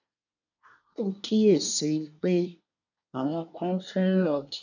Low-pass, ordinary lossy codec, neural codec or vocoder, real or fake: 7.2 kHz; none; codec, 24 kHz, 1 kbps, SNAC; fake